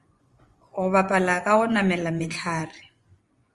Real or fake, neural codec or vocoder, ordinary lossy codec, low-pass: fake; vocoder, 24 kHz, 100 mel bands, Vocos; Opus, 32 kbps; 10.8 kHz